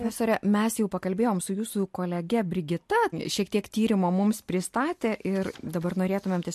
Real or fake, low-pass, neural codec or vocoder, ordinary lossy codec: real; 14.4 kHz; none; MP3, 64 kbps